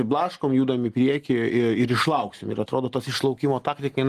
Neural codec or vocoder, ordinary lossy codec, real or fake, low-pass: none; Opus, 24 kbps; real; 14.4 kHz